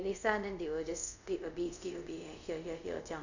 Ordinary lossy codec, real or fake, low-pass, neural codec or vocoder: none; fake; 7.2 kHz; codec, 24 kHz, 0.5 kbps, DualCodec